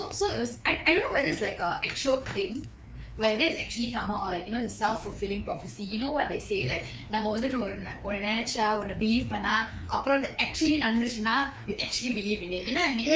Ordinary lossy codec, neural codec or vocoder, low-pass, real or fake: none; codec, 16 kHz, 2 kbps, FreqCodec, larger model; none; fake